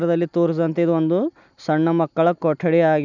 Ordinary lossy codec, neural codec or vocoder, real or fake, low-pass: none; none; real; 7.2 kHz